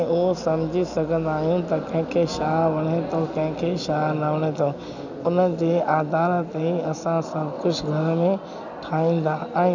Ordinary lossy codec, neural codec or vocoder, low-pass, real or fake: none; none; 7.2 kHz; real